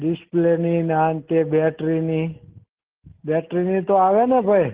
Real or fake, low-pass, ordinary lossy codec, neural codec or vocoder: real; 3.6 kHz; Opus, 16 kbps; none